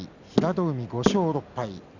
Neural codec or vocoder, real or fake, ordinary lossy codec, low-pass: none; real; none; 7.2 kHz